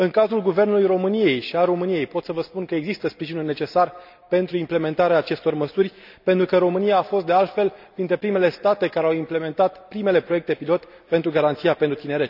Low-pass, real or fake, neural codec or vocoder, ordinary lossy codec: 5.4 kHz; real; none; none